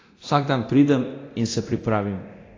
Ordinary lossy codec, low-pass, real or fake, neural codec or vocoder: AAC, 32 kbps; 7.2 kHz; fake; codec, 24 kHz, 0.9 kbps, DualCodec